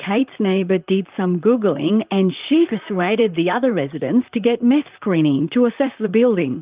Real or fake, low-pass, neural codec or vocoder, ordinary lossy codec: fake; 3.6 kHz; vocoder, 44.1 kHz, 128 mel bands, Pupu-Vocoder; Opus, 32 kbps